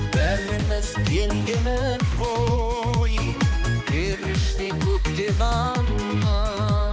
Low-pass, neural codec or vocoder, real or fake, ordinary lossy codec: none; codec, 16 kHz, 2 kbps, X-Codec, HuBERT features, trained on balanced general audio; fake; none